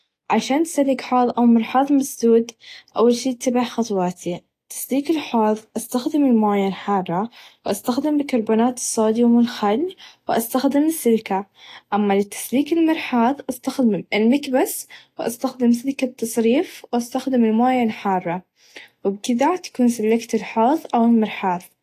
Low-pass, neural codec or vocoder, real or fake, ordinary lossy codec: 14.4 kHz; none; real; AAC, 48 kbps